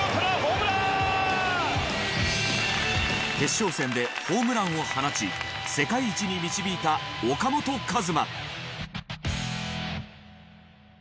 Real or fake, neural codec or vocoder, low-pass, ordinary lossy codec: real; none; none; none